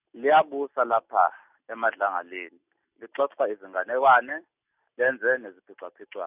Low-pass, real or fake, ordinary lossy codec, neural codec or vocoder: 3.6 kHz; real; none; none